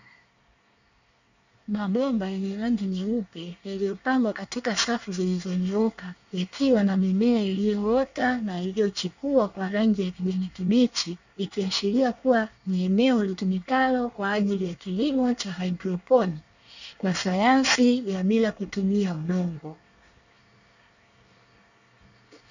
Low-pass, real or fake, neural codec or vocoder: 7.2 kHz; fake; codec, 24 kHz, 1 kbps, SNAC